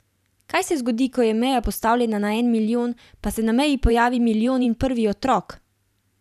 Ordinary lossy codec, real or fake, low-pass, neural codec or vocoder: none; fake; 14.4 kHz; vocoder, 44.1 kHz, 128 mel bands every 512 samples, BigVGAN v2